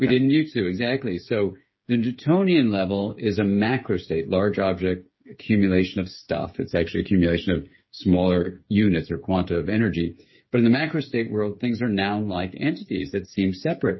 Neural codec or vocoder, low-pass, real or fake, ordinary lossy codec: codec, 16 kHz, 8 kbps, FreqCodec, smaller model; 7.2 kHz; fake; MP3, 24 kbps